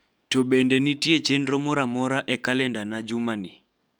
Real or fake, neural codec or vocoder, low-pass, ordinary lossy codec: fake; codec, 44.1 kHz, 7.8 kbps, DAC; 19.8 kHz; none